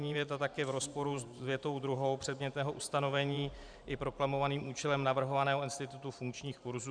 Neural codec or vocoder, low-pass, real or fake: vocoder, 44.1 kHz, 128 mel bands every 512 samples, BigVGAN v2; 9.9 kHz; fake